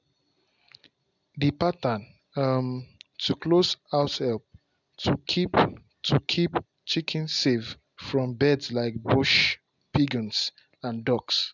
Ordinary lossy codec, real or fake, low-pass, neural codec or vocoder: none; real; 7.2 kHz; none